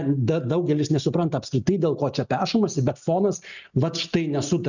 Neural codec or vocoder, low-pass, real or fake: none; 7.2 kHz; real